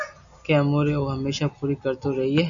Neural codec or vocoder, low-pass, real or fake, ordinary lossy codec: none; 7.2 kHz; real; MP3, 96 kbps